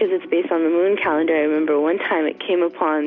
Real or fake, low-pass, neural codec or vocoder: real; 7.2 kHz; none